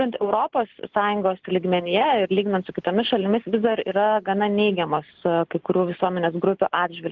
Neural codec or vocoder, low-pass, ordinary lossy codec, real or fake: none; 7.2 kHz; Opus, 16 kbps; real